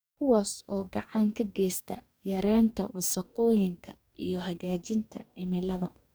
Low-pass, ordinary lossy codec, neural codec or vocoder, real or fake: none; none; codec, 44.1 kHz, 2.6 kbps, DAC; fake